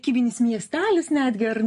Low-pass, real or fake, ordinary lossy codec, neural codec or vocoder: 14.4 kHz; real; MP3, 48 kbps; none